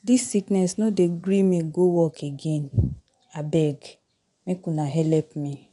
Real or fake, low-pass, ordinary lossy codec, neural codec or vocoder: fake; 10.8 kHz; none; vocoder, 24 kHz, 100 mel bands, Vocos